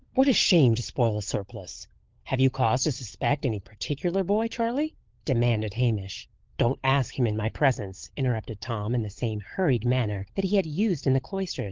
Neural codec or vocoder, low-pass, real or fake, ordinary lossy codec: codec, 16 kHz, 16 kbps, FunCodec, trained on LibriTTS, 50 frames a second; 7.2 kHz; fake; Opus, 16 kbps